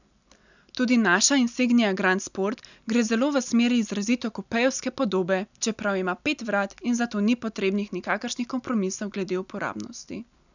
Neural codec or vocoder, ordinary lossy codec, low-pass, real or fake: none; none; 7.2 kHz; real